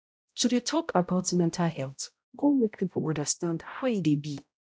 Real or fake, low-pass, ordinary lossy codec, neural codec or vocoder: fake; none; none; codec, 16 kHz, 0.5 kbps, X-Codec, HuBERT features, trained on balanced general audio